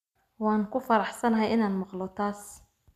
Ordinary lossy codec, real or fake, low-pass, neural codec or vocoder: MP3, 96 kbps; real; 14.4 kHz; none